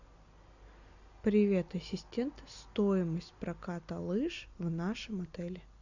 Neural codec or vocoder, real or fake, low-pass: none; real; 7.2 kHz